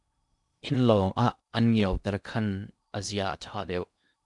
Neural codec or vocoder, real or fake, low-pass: codec, 16 kHz in and 24 kHz out, 0.8 kbps, FocalCodec, streaming, 65536 codes; fake; 10.8 kHz